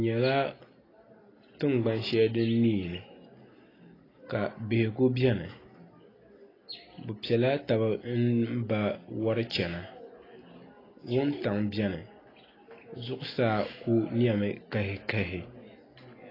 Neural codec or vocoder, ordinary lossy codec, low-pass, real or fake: none; AAC, 24 kbps; 5.4 kHz; real